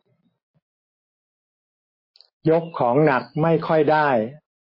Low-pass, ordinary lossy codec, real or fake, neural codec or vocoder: 5.4 kHz; MP3, 24 kbps; real; none